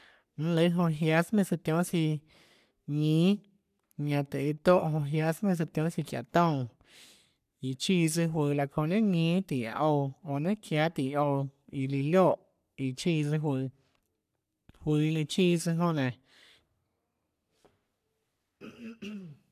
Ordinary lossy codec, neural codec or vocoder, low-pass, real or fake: none; codec, 44.1 kHz, 3.4 kbps, Pupu-Codec; 14.4 kHz; fake